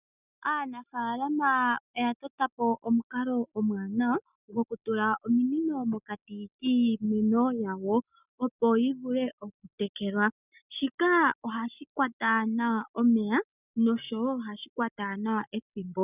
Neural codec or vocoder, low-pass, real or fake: none; 3.6 kHz; real